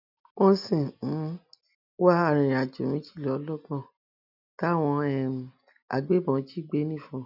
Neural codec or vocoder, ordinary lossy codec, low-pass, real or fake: none; none; 5.4 kHz; real